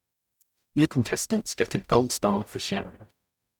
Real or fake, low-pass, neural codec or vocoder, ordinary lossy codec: fake; 19.8 kHz; codec, 44.1 kHz, 0.9 kbps, DAC; none